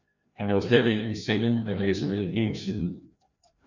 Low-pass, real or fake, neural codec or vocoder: 7.2 kHz; fake; codec, 16 kHz, 1 kbps, FreqCodec, larger model